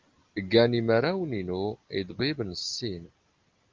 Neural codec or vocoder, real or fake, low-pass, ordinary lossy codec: none; real; 7.2 kHz; Opus, 24 kbps